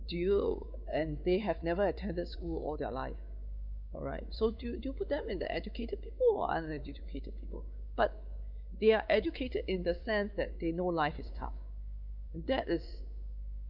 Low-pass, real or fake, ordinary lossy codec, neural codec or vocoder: 5.4 kHz; fake; none; codec, 16 kHz, 4 kbps, X-Codec, WavLM features, trained on Multilingual LibriSpeech